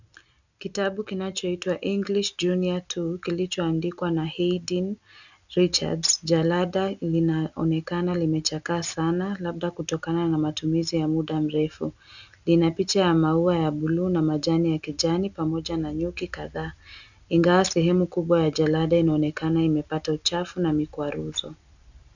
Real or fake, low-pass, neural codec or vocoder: real; 7.2 kHz; none